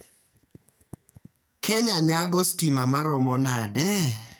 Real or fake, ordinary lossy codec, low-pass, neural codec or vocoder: fake; none; none; codec, 44.1 kHz, 2.6 kbps, SNAC